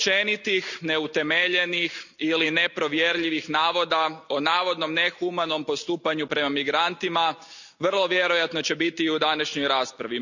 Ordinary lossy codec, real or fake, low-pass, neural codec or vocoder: none; real; 7.2 kHz; none